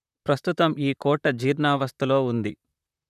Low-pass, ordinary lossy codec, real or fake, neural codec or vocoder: 14.4 kHz; none; fake; vocoder, 44.1 kHz, 128 mel bands, Pupu-Vocoder